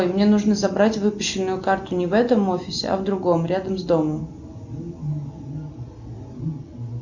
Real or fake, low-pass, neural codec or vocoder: real; 7.2 kHz; none